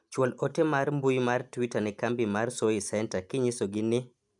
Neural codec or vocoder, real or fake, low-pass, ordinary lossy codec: none; real; 10.8 kHz; none